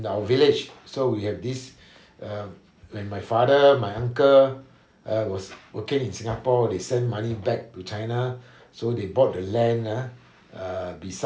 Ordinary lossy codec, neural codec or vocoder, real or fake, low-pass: none; none; real; none